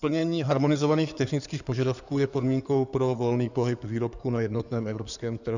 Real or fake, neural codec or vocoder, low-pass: fake; codec, 16 kHz in and 24 kHz out, 2.2 kbps, FireRedTTS-2 codec; 7.2 kHz